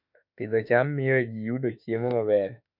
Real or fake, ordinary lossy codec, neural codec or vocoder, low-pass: fake; MP3, 48 kbps; autoencoder, 48 kHz, 32 numbers a frame, DAC-VAE, trained on Japanese speech; 5.4 kHz